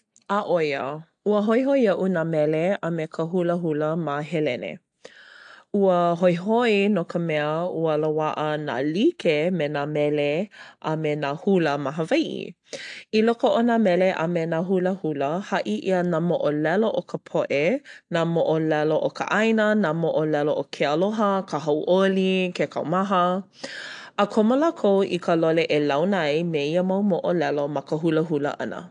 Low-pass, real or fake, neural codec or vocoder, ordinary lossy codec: 9.9 kHz; real; none; AAC, 64 kbps